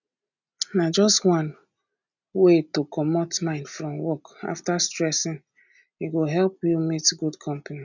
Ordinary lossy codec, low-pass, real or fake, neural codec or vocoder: none; 7.2 kHz; real; none